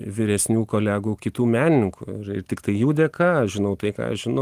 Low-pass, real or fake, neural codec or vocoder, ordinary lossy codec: 14.4 kHz; real; none; Opus, 24 kbps